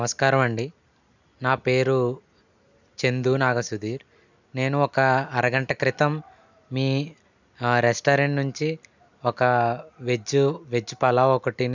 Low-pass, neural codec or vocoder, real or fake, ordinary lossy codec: 7.2 kHz; none; real; none